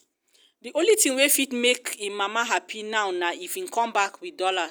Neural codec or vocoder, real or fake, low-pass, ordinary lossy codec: none; real; none; none